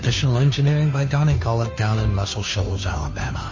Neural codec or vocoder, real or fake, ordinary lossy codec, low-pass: codec, 16 kHz, 2 kbps, FunCodec, trained on Chinese and English, 25 frames a second; fake; MP3, 32 kbps; 7.2 kHz